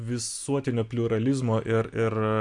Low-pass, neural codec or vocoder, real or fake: 10.8 kHz; none; real